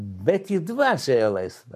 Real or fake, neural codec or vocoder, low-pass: fake; codec, 44.1 kHz, 7.8 kbps, Pupu-Codec; 14.4 kHz